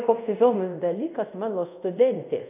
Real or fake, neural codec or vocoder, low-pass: fake; codec, 24 kHz, 0.5 kbps, DualCodec; 3.6 kHz